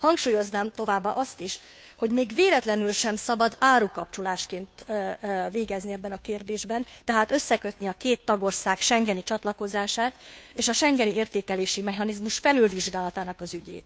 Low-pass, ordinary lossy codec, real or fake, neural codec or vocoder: none; none; fake; codec, 16 kHz, 2 kbps, FunCodec, trained on Chinese and English, 25 frames a second